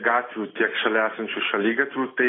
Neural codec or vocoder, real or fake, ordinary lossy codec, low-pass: none; real; AAC, 16 kbps; 7.2 kHz